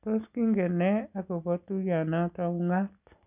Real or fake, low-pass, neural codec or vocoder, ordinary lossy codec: real; 3.6 kHz; none; none